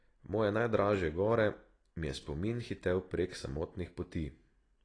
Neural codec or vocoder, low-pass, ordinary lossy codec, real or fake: none; 9.9 kHz; AAC, 32 kbps; real